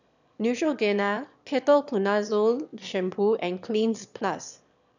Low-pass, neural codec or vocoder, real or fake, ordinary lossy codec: 7.2 kHz; autoencoder, 22.05 kHz, a latent of 192 numbers a frame, VITS, trained on one speaker; fake; none